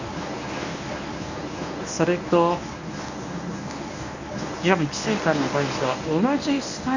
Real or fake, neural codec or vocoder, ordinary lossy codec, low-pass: fake; codec, 24 kHz, 0.9 kbps, WavTokenizer, medium speech release version 1; none; 7.2 kHz